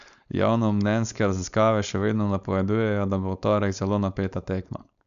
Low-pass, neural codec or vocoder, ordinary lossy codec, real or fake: 7.2 kHz; codec, 16 kHz, 4.8 kbps, FACodec; none; fake